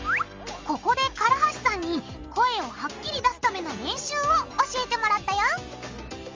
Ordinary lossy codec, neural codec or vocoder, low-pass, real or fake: Opus, 32 kbps; none; 7.2 kHz; real